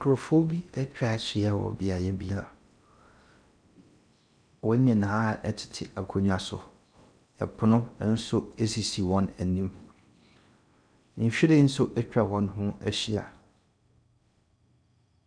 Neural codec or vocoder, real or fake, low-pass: codec, 16 kHz in and 24 kHz out, 0.6 kbps, FocalCodec, streaming, 4096 codes; fake; 9.9 kHz